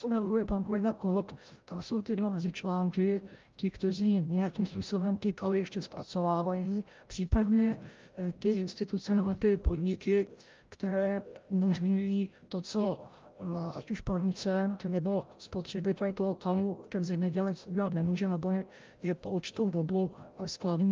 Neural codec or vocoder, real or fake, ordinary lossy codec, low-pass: codec, 16 kHz, 0.5 kbps, FreqCodec, larger model; fake; Opus, 24 kbps; 7.2 kHz